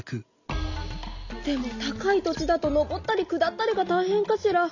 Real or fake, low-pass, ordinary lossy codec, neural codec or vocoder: real; 7.2 kHz; none; none